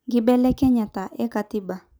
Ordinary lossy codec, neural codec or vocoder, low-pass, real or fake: none; none; none; real